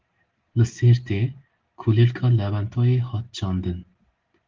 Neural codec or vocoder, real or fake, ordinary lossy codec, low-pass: none; real; Opus, 16 kbps; 7.2 kHz